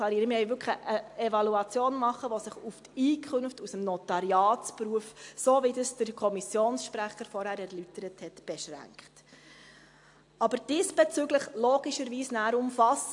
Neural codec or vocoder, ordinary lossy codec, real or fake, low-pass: none; AAC, 64 kbps; real; 10.8 kHz